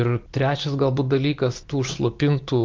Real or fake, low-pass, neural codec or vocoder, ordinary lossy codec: real; 7.2 kHz; none; Opus, 32 kbps